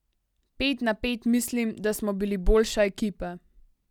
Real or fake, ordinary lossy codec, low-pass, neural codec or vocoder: real; none; 19.8 kHz; none